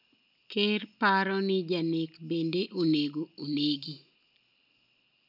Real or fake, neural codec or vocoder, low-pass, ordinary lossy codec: real; none; 5.4 kHz; none